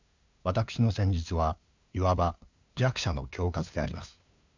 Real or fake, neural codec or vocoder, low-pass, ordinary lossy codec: fake; codec, 16 kHz, 2 kbps, FunCodec, trained on LibriTTS, 25 frames a second; 7.2 kHz; MP3, 64 kbps